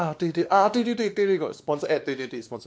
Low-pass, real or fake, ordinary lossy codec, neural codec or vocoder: none; fake; none; codec, 16 kHz, 2 kbps, X-Codec, WavLM features, trained on Multilingual LibriSpeech